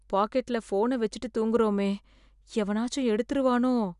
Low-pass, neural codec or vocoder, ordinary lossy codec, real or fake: 10.8 kHz; none; none; real